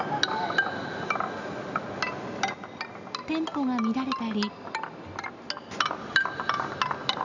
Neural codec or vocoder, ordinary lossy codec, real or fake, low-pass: none; none; real; 7.2 kHz